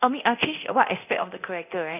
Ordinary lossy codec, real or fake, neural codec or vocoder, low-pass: none; fake; codec, 24 kHz, 0.9 kbps, DualCodec; 3.6 kHz